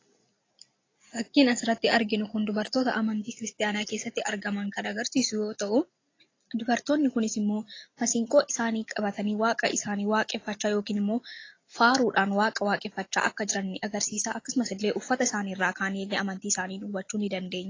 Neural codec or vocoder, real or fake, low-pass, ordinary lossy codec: none; real; 7.2 kHz; AAC, 32 kbps